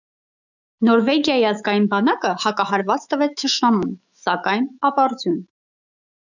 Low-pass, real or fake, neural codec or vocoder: 7.2 kHz; fake; autoencoder, 48 kHz, 128 numbers a frame, DAC-VAE, trained on Japanese speech